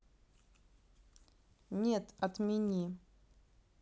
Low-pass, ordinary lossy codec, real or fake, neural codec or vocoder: none; none; real; none